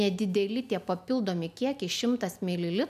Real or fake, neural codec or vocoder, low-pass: real; none; 14.4 kHz